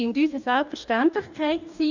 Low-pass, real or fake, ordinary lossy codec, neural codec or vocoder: 7.2 kHz; fake; none; codec, 44.1 kHz, 2.6 kbps, DAC